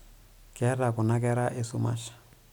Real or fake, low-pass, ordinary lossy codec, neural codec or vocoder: real; none; none; none